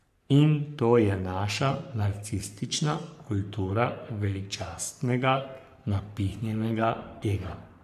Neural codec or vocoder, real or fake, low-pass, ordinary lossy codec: codec, 44.1 kHz, 3.4 kbps, Pupu-Codec; fake; 14.4 kHz; none